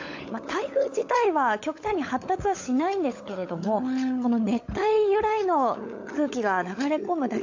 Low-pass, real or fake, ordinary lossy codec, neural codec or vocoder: 7.2 kHz; fake; AAC, 48 kbps; codec, 16 kHz, 16 kbps, FunCodec, trained on LibriTTS, 50 frames a second